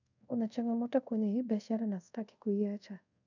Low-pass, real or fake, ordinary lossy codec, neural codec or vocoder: 7.2 kHz; fake; none; codec, 24 kHz, 0.5 kbps, DualCodec